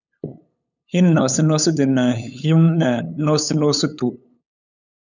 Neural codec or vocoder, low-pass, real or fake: codec, 16 kHz, 8 kbps, FunCodec, trained on LibriTTS, 25 frames a second; 7.2 kHz; fake